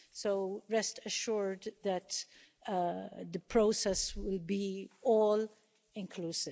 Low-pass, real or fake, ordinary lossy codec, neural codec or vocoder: none; real; none; none